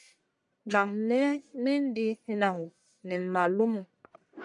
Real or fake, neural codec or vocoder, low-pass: fake; codec, 44.1 kHz, 1.7 kbps, Pupu-Codec; 10.8 kHz